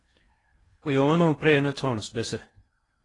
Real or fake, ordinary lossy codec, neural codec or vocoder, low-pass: fake; AAC, 32 kbps; codec, 16 kHz in and 24 kHz out, 0.8 kbps, FocalCodec, streaming, 65536 codes; 10.8 kHz